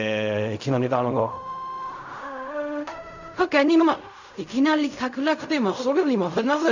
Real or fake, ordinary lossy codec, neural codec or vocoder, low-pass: fake; none; codec, 16 kHz in and 24 kHz out, 0.4 kbps, LongCat-Audio-Codec, fine tuned four codebook decoder; 7.2 kHz